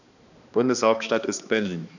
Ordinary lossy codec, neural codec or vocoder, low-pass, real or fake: none; codec, 16 kHz, 2 kbps, X-Codec, HuBERT features, trained on balanced general audio; 7.2 kHz; fake